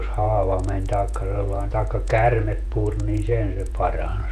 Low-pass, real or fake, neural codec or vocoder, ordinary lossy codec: 14.4 kHz; fake; vocoder, 48 kHz, 128 mel bands, Vocos; none